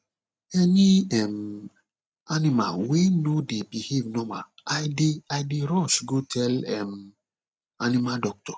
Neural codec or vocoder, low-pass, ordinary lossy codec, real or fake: none; none; none; real